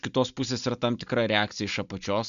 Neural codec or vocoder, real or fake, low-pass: none; real; 7.2 kHz